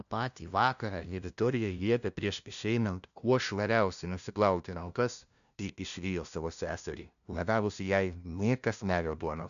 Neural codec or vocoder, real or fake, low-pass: codec, 16 kHz, 0.5 kbps, FunCodec, trained on LibriTTS, 25 frames a second; fake; 7.2 kHz